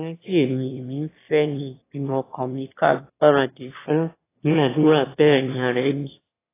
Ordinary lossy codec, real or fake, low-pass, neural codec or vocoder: AAC, 16 kbps; fake; 3.6 kHz; autoencoder, 22.05 kHz, a latent of 192 numbers a frame, VITS, trained on one speaker